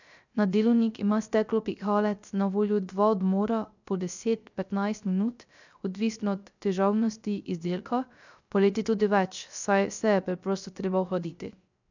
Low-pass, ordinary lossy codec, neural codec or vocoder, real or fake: 7.2 kHz; none; codec, 16 kHz, 0.3 kbps, FocalCodec; fake